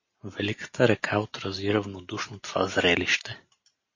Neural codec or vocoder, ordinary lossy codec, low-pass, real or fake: none; MP3, 32 kbps; 7.2 kHz; real